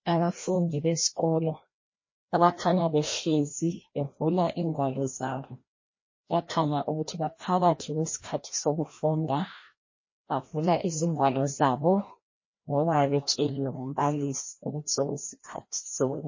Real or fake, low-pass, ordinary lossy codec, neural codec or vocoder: fake; 7.2 kHz; MP3, 32 kbps; codec, 16 kHz, 1 kbps, FreqCodec, larger model